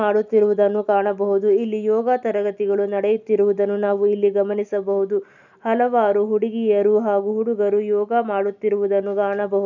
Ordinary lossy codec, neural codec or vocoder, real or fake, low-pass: none; none; real; 7.2 kHz